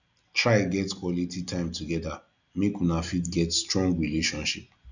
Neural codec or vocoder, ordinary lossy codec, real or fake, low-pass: none; none; real; 7.2 kHz